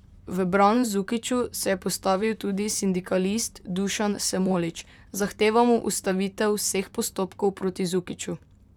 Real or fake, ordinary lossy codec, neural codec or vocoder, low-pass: fake; none; vocoder, 44.1 kHz, 128 mel bands, Pupu-Vocoder; 19.8 kHz